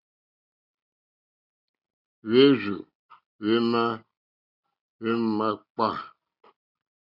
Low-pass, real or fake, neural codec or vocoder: 5.4 kHz; real; none